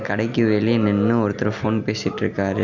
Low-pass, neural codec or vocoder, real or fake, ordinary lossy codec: 7.2 kHz; none; real; none